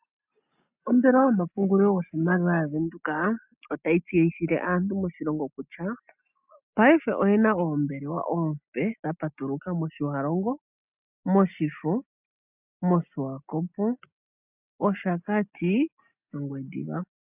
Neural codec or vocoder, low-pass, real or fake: none; 3.6 kHz; real